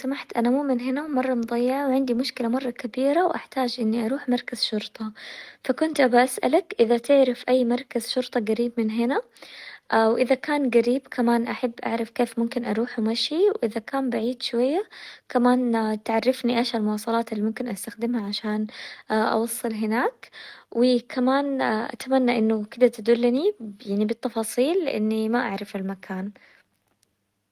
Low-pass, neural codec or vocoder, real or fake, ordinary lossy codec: 14.4 kHz; none; real; Opus, 24 kbps